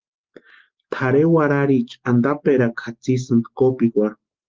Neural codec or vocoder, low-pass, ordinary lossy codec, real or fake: none; 7.2 kHz; Opus, 16 kbps; real